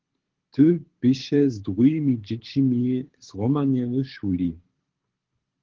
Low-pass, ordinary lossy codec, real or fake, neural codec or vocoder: 7.2 kHz; Opus, 16 kbps; fake; codec, 24 kHz, 6 kbps, HILCodec